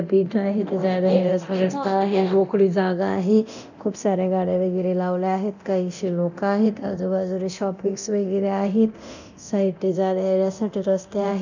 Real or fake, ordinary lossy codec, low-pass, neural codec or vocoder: fake; none; 7.2 kHz; codec, 24 kHz, 0.9 kbps, DualCodec